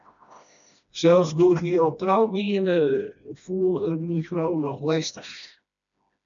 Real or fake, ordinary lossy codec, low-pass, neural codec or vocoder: fake; MP3, 96 kbps; 7.2 kHz; codec, 16 kHz, 1 kbps, FreqCodec, smaller model